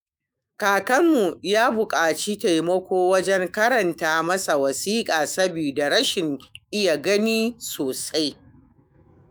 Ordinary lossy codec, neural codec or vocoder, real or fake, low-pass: none; autoencoder, 48 kHz, 128 numbers a frame, DAC-VAE, trained on Japanese speech; fake; none